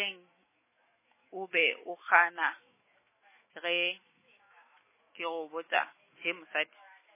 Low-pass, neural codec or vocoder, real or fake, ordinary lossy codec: 3.6 kHz; autoencoder, 48 kHz, 128 numbers a frame, DAC-VAE, trained on Japanese speech; fake; MP3, 16 kbps